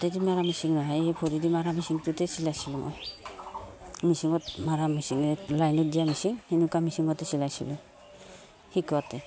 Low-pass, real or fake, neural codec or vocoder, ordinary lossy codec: none; real; none; none